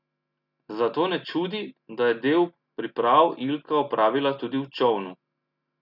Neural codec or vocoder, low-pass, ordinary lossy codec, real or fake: none; 5.4 kHz; none; real